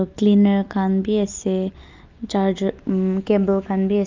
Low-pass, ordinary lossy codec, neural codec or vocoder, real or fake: 7.2 kHz; Opus, 24 kbps; none; real